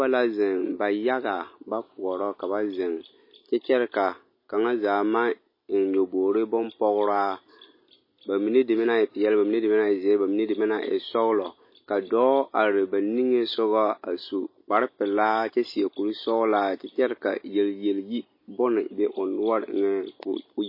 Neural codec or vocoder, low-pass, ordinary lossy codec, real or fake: none; 5.4 kHz; MP3, 24 kbps; real